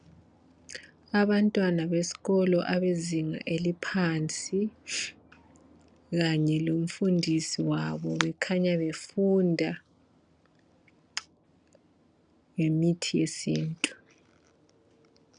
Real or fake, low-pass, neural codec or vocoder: real; 9.9 kHz; none